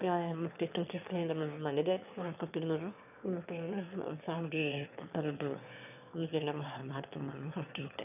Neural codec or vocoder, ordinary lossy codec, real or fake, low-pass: autoencoder, 22.05 kHz, a latent of 192 numbers a frame, VITS, trained on one speaker; none; fake; 3.6 kHz